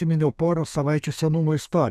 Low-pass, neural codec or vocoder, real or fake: 14.4 kHz; codec, 32 kHz, 1.9 kbps, SNAC; fake